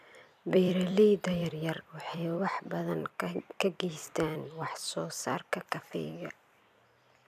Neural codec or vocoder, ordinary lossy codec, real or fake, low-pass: vocoder, 44.1 kHz, 128 mel bands every 256 samples, BigVGAN v2; none; fake; 14.4 kHz